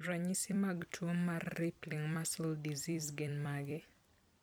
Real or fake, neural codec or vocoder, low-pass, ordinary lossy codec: fake; vocoder, 44.1 kHz, 128 mel bands every 256 samples, BigVGAN v2; none; none